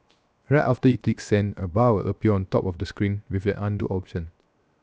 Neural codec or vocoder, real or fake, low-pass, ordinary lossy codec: codec, 16 kHz, 0.7 kbps, FocalCodec; fake; none; none